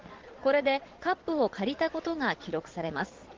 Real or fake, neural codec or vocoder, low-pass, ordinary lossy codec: fake; vocoder, 22.05 kHz, 80 mel bands, Vocos; 7.2 kHz; Opus, 16 kbps